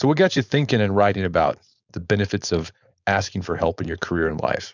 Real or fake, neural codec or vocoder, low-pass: fake; codec, 16 kHz, 4.8 kbps, FACodec; 7.2 kHz